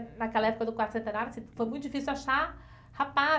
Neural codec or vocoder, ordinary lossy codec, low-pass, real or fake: none; none; none; real